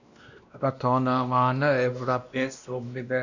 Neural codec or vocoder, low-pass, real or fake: codec, 16 kHz, 1 kbps, X-Codec, WavLM features, trained on Multilingual LibriSpeech; 7.2 kHz; fake